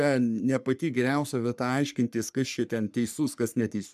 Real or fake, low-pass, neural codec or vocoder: fake; 14.4 kHz; codec, 44.1 kHz, 3.4 kbps, Pupu-Codec